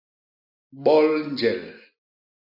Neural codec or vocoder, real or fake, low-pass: none; real; 5.4 kHz